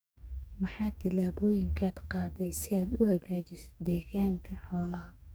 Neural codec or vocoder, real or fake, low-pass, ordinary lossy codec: codec, 44.1 kHz, 2.6 kbps, DAC; fake; none; none